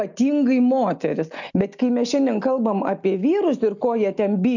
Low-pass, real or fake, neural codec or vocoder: 7.2 kHz; real; none